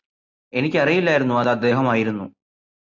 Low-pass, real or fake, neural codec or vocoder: 7.2 kHz; real; none